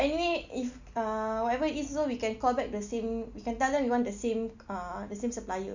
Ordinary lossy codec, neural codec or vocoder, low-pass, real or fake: none; none; 7.2 kHz; real